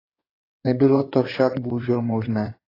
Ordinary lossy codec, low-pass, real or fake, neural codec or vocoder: AAC, 24 kbps; 5.4 kHz; fake; codec, 16 kHz in and 24 kHz out, 2.2 kbps, FireRedTTS-2 codec